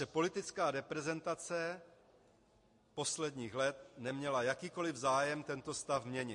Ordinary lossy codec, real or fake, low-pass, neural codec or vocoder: MP3, 48 kbps; real; 10.8 kHz; none